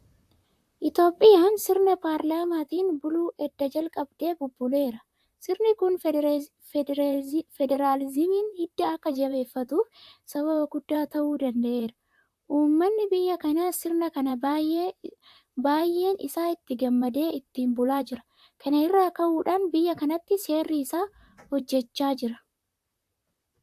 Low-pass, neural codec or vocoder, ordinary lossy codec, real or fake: 14.4 kHz; vocoder, 44.1 kHz, 128 mel bands, Pupu-Vocoder; AAC, 96 kbps; fake